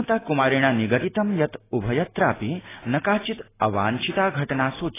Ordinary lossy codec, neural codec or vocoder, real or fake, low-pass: AAC, 16 kbps; none; real; 3.6 kHz